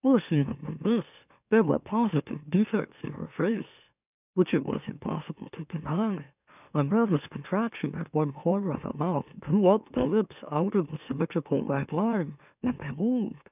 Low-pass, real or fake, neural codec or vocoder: 3.6 kHz; fake; autoencoder, 44.1 kHz, a latent of 192 numbers a frame, MeloTTS